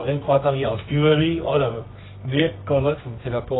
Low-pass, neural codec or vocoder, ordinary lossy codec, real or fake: 7.2 kHz; codec, 24 kHz, 0.9 kbps, WavTokenizer, medium music audio release; AAC, 16 kbps; fake